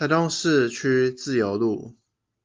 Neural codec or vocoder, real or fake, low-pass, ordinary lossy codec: none; real; 7.2 kHz; Opus, 32 kbps